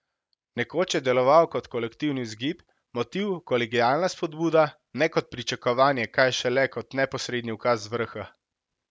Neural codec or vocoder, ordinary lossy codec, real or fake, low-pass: none; none; real; none